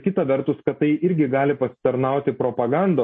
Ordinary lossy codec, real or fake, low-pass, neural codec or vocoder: MP3, 32 kbps; real; 10.8 kHz; none